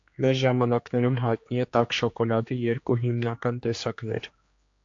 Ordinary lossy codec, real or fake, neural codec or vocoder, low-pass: AAC, 48 kbps; fake; codec, 16 kHz, 2 kbps, X-Codec, HuBERT features, trained on general audio; 7.2 kHz